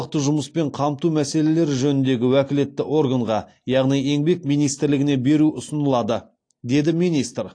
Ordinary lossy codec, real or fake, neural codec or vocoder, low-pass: AAC, 48 kbps; real; none; 9.9 kHz